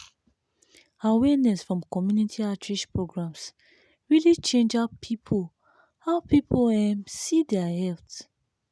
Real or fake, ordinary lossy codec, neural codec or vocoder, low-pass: real; none; none; none